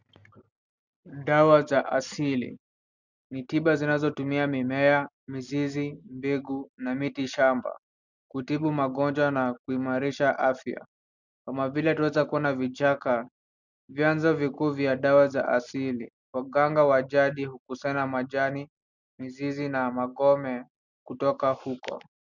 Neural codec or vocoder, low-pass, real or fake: none; 7.2 kHz; real